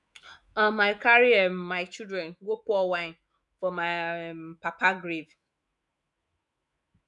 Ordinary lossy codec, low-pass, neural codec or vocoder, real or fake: none; 10.8 kHz; autoencoder, 48 kHz, 128 numbers a frame, DAC-VAE, trained on Japanese speech; fake